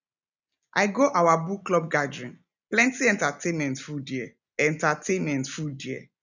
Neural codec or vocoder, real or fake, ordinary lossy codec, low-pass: none; real; none; 7.2 kHz